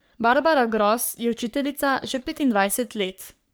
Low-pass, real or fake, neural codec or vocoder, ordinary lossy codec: none; fake; codec, 44.1 kHz, 3.4 kbps, Pupu-Codec; none